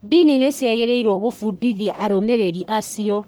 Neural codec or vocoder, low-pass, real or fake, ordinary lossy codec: codec, 44.1 kHz, 1.7 kbps, Pupu-Codec; none; fake; none